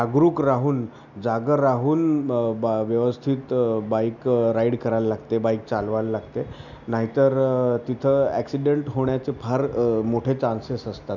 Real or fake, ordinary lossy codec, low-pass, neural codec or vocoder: real; none; 7.2 kHz; none